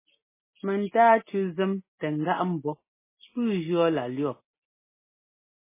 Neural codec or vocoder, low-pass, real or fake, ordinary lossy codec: none; 3.6 kHz; real; MP3, 16 kbps